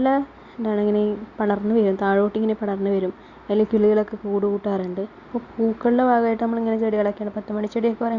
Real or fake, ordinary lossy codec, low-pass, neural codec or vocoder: real; Opus, 64 kbps; 7.2 kHz; none